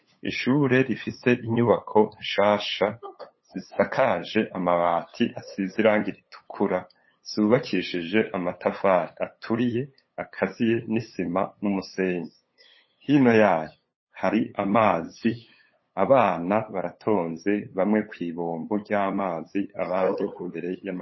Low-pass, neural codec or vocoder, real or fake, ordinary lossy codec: 7.2 kHz; codec, 16 kHz, 8 kbps, FunCodec, trained on LibriTTS, 25 frames a second; fake; MP3, 24 kbps